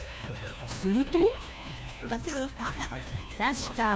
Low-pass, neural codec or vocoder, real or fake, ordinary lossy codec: none; codec, 16 kHz, 1 kbps, FunCodec, trained on LibriTTS, 50 frames a second; fake; none